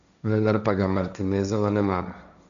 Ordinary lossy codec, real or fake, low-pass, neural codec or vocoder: none; fake; 7.2 kHz; codec, 16 kHz, 1.1 kbps, Voila-Tokenizer